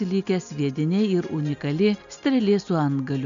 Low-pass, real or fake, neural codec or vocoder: 7.2 kHz; real; none